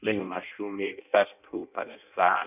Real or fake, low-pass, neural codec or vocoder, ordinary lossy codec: fake; 3.6 kHz; codec, 16 kHz in and 24 kHz out, 0.6 kbps, FireRedTTS-2 codec; none